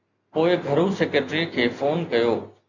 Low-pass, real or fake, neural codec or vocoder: 7.2 kHz; real; none